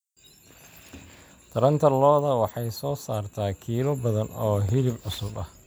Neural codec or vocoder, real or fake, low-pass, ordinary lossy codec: none; real; none; none